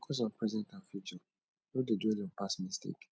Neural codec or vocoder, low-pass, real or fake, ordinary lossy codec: none; none; real; none